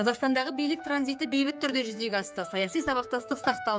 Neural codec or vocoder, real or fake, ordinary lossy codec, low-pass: codec, 16 kHz, 4 kbps, X-Codec, HuBERT features, trained on general audio; fake; none; none